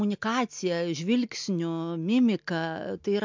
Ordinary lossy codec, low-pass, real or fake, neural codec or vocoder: MP3, 64 kbps; 7.2 kHz; real; none